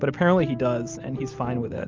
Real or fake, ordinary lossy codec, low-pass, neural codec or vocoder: real; Opus, 32 kbps; 7.2 kHz; none